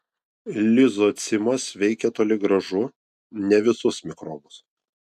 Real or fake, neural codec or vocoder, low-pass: real; none; 14.4 kHz